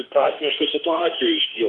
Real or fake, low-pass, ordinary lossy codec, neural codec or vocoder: fake; 10.8 kHz; Opus, 32 kbps; autoencoder, 48 kHz, 32 numbers a frame, DAC-VAE, trained on Japanese speech